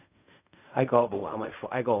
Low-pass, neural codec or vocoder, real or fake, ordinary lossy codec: 3.6 kHz; codec, 16 kHz in and 24 kHz out, 0.4 kbps, LongCat-Audio-Codec, fine tuned four codebook decoder; fake; none